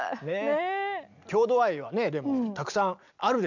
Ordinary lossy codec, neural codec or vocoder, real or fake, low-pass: none; none; real; 7.2 kHz